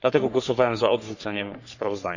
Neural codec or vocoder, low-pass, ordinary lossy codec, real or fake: codec, 44.1 kHz, 3.4 kbps, Pupu-Codec; 7.2 kHz; none; fake